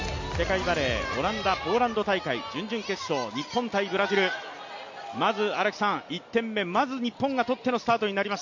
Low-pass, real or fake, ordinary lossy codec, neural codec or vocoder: 7.2 kHz; real; MP3, 48 kbps; none